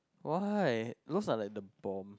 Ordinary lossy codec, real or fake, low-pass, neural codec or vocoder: none; real; none; none